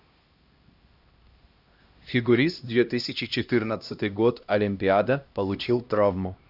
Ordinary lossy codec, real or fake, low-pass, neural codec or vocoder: Opus, 64 kbps; fake; 5.4 kHz; codec, 16 kHz, 1 kbps, X-Codec, HuBERT features, trained on LibriSpeech